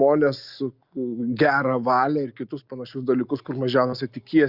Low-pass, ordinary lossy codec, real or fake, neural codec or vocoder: 5.4 kHz; Opus, 64 kbps; real; none